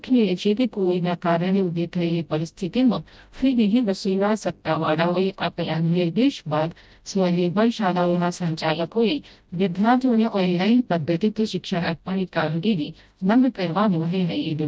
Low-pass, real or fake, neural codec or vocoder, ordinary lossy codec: none; fake; codec, 16 kHz, 0.5 kbps, FreqCodec, smaller model; none